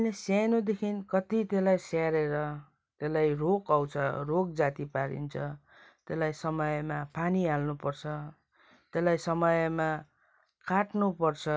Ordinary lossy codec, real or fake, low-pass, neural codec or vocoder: none; real; none; none